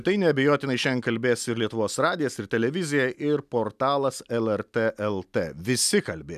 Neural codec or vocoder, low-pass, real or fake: none; 14.4 kHz; real